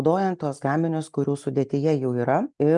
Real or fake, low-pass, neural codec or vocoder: real; 10.8 kHz; none